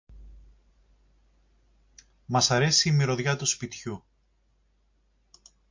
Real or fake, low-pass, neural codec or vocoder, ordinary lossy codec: real; 7.2 kHz; none; MP3, 48 kbps